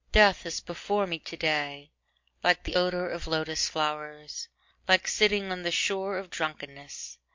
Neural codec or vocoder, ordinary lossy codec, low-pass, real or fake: none; MP3, 48 kbps; 7.2 kHz; real